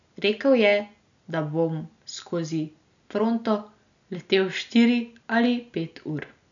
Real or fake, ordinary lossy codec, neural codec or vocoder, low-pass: real; none; none; 7.2 kHz